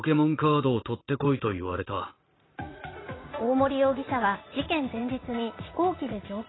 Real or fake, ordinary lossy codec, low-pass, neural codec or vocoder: fake; AAC, 16 kbps; 7.2 kHz; vocoder, 44.1 kHz, 80 mel bands, Vocos